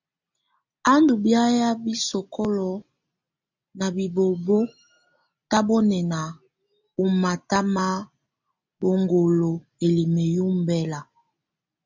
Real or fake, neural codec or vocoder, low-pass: real; none; 7.2 kHz